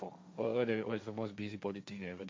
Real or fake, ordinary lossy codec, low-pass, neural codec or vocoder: fake; none; none; codec, 16 kHz, 1.1 kbps, Voila-Tokenizer